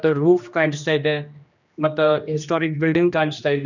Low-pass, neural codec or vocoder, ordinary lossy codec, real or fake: 7.2 kHz; codec, 16 kHz, 1 kbps, X-Codec, HuBERT features, trained on general audio; none; fake